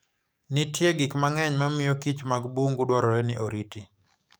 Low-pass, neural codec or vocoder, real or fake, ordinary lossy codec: none; codec, 44.1 kHz, 7.8 kbps, DAC; fake; none